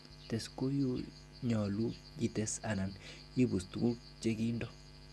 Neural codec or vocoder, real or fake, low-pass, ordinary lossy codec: none; real; none; none